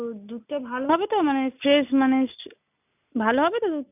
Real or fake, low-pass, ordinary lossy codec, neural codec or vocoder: real; 3.6 kHz; none; none